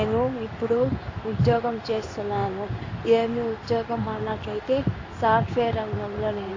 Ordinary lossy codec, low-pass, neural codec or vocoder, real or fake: AAC, 32 kbps; 7.2 kHz; codec, 16 kHz in and 24 kHz out, 1 kbps, XY-Tokenizer; fake